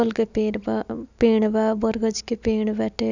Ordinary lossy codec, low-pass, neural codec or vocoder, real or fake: none; 7.2 kHz; none; real